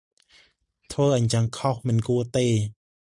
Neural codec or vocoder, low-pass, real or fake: none; 10.8 kHz; real